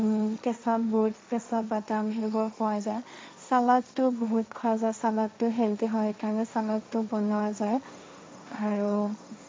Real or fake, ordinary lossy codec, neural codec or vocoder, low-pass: fake; none; codec, 16 kHz, 1.1 kbps, Voila-Tokenizer; none